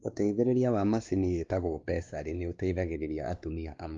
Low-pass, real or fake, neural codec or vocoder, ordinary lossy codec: 7.2 kHz; fake; codec, 16 kHz, 2 kbps, X-Codec, WavLM features, trained on Multilingual LibriSpeech; Opus, 32 kbps